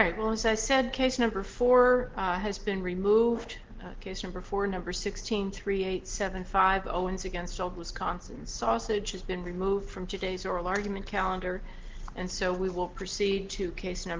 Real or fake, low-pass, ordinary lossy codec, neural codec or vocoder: real; 7.2 kHz; Opus, 16 kbps; none